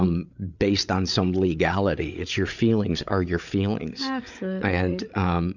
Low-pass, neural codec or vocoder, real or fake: 7.2 kHz; codec, 16 kHz, 8 kbps, FreqCodec, larger model; fake